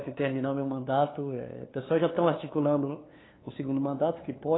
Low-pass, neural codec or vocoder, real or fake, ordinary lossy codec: 7.2 kHz; codec, 16 kHz, 2 kbps, FunCodec, trained on LibriTTS, 25 frames a second; fake; AAC, 16 kbps